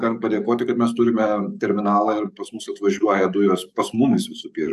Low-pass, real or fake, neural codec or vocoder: 14.4 kHz; fake; codec, 44.1 kHz, 7.8 kbps, DAC